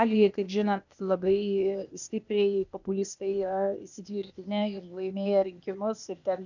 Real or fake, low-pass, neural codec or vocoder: fake; 7.2 kHz; codec, 16 kHz, 0.8 kbps, ZipCodec